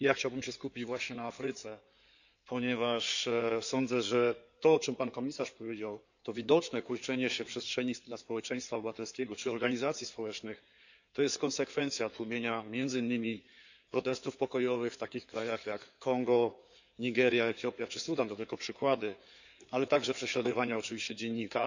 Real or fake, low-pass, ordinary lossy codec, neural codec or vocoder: fake; 7.2 kHz; none; codec, 16 kHz in and 24 kHz out, 2.2 kbps, FireRedTTS-2 codec